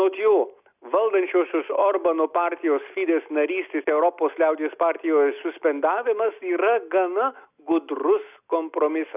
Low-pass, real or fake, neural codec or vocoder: 3.6 kHz; real; none